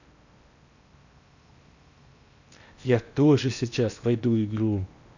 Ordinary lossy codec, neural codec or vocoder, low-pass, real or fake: none; codec, 16 kHz in and 24 kHz out, 0.8 kbps, FocalCodec, streaming, 65536 codes; 7.2 kHz; fake